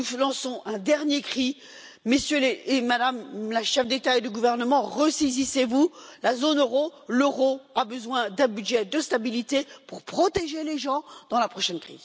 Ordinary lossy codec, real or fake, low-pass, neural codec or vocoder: none; real; none; none